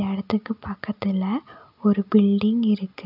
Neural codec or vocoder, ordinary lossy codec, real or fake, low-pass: none; none; real; 5.4 kHz